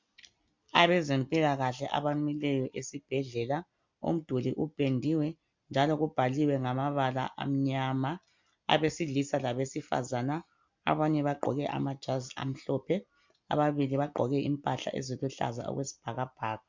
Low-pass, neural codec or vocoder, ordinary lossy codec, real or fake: 7.2 kHz; none; MP3, 64 kbps; real